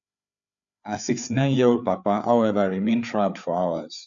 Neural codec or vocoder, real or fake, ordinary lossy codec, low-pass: codec, 16 kHz, 4 kbps, FreqCodec, larger model; fake; none; 7.2 kHz